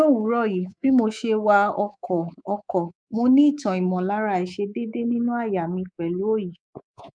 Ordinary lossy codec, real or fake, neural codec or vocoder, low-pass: none; fake; codec, 24 kHz, 3.1 kbps, DualCodec; 9.9 kHz